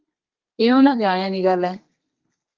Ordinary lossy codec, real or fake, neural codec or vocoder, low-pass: Opus, 32 kbps; fake; codec, 32 kHz, 1.9 kbps, SNAC; 7.2 kHz